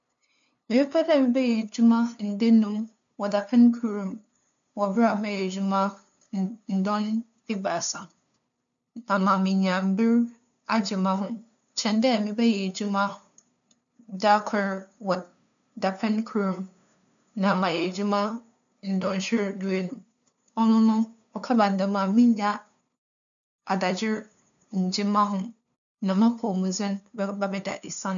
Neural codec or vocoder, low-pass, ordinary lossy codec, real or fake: codec, 16 kHz, 2 kbps, FunCodec, trained on LibriTTS, 25 frames a second; 7.2 kHz; none; fake